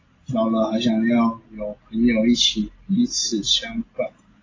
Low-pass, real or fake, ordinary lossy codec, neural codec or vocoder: 7.2 kHz; real; AAC, 32 kbps; none